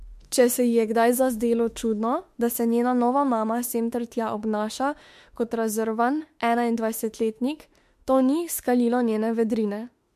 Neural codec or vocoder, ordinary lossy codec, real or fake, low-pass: autoencoder, 48 kHz, 32 numbers a frame, DAC-VAE, trained on Japanese speech; MP3, 64 kbps; fake; 14.4 kHz